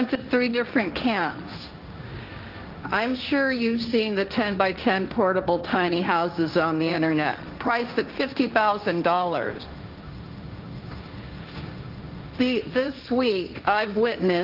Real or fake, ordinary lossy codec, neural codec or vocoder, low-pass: fake; Opus, 24 kbps; codec, 16 kHz, 1.1 kbps, Voila-Tokenizer; 5.4 kHz